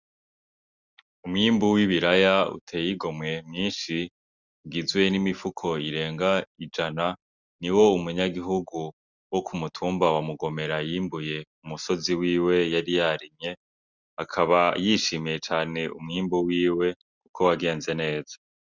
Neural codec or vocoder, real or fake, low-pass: none; real; 7.2 kHz